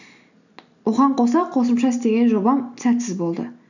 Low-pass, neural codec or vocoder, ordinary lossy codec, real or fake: 7.2 kHz; none; none; real